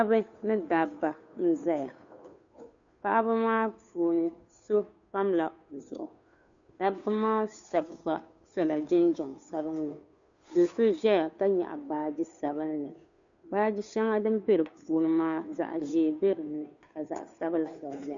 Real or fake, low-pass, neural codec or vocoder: fake; 7.2 kHz; codec, 16 kHz, 2 kbps, FunCodec, trained on Chinese and English, 25 frames a second